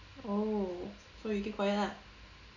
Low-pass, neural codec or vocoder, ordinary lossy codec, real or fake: 7.2 kHz; none; none; real